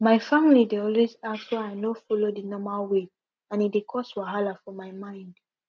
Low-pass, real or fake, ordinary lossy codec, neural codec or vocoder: none; real; none; none